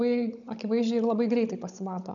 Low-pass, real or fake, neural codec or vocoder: 7.2 kHz; fake; codec, 16 kHz, 16 kbps, FunCodec, trained on Chinese and English, 50 frames a second